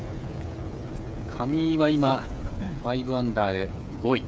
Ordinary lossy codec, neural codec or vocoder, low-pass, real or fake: none; codec, 16 kHz, 8 kbps, FreqCodec, smaller model; none; fake